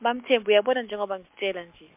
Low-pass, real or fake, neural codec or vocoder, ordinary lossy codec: 3.6 kHz; real; none; MP3, 32 kbps